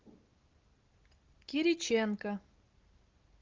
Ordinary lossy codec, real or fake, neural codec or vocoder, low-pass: Opus, 32 kbps; real; none; 7.2 kHz